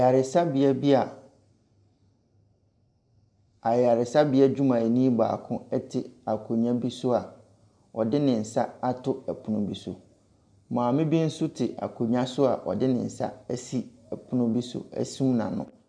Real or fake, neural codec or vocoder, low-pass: real; none; 9.9 kHz